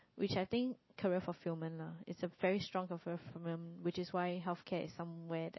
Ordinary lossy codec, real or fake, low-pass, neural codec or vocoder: MP3, 24 kbps; real; 7.2 kHz; none